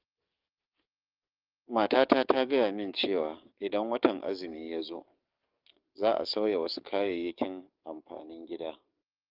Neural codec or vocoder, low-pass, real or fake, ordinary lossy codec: codec, 44.1 kHz, 7.8 kbps, DAC; 5.4 kHz; fake; Opus, 32 kbps